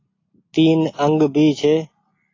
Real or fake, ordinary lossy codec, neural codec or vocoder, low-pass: real; AAC, 32 kbps; none; 7.2 kHz